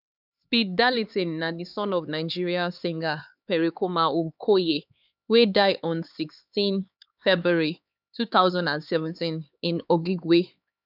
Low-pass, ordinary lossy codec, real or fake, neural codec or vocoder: 5.4 kHz; none; fake; codec, 16 kHz, 4 kbps, X-Codec, HuBERT features, trained on LibriSpeech